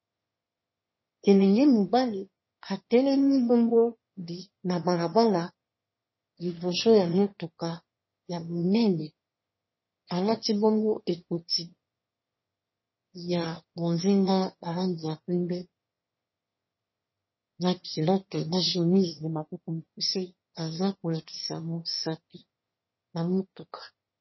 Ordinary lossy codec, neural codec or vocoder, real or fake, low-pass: MP3, 24 kbps; autoencoder, 22.05 kHz, a latent of 192 numbers a frame, VITS, trained on one speaker; fake; 7.2 kHz